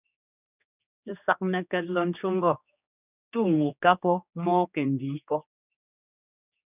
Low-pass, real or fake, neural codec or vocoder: 3.6 kHz; fake; codec, 16 kHz, 2 kbps, X-Codec, HuBERT features, trained on general audio